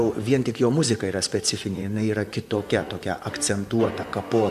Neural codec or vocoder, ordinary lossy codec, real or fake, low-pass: vocoder, 44.1 kHz, 128 mel bands, Pupu-Vocoder; MP3, 96 kbps; fake; 14.4 kHz